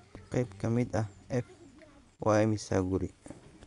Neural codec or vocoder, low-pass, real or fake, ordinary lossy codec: vocoder, 24 kHz, 100 mel bands, Vocos; 10.8 kHz; fake; none